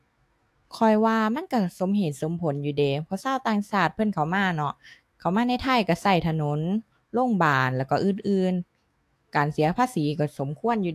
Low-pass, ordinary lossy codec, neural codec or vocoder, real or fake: 14.4 kHz; AAC, 64 kbps; autoencoder, 48 kHz, 128 numbers a frame, DAC-VAE, trained on Japanese speech; fake